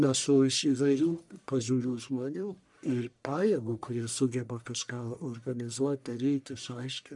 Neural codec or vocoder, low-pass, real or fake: codec, 44.1 kHz, 1.7 kbps, Pupu-Codec; 10.8 kHz; fake